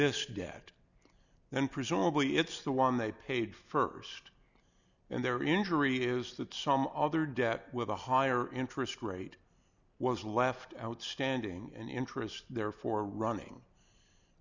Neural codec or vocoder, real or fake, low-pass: none; real; 7.2 kHz